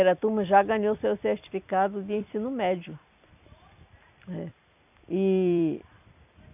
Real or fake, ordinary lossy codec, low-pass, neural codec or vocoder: fake; none; 3.6 kHz; vocoder, 44.1 kHz, 128 mel bands every 256 samples, BigVGAN v2